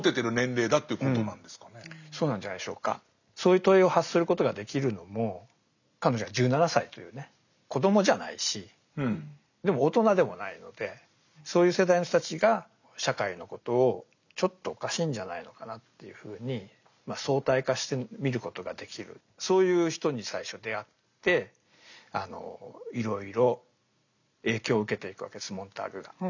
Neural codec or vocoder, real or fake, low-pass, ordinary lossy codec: none; real; 7.2 kHz; none